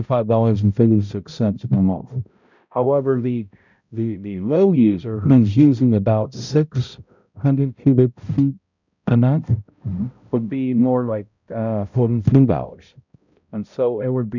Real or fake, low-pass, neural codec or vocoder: fake; 7.2 kHz; codec, 16 kHz, 0.5 kbps, X-Codec, HuBERT features, trained on balanced general audio